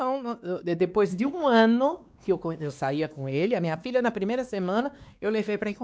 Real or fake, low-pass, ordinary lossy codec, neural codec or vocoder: fake; none; none; codec, 16 kHz, 2 kbps, X-Codec, WavLM features, trained on Multilingual LibriSpeech